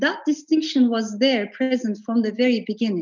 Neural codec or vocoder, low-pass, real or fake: none; 7.2 kHz; real